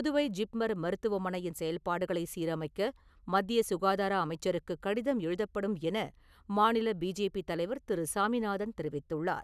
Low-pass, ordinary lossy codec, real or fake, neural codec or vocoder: 14.4 kHz; none; real; none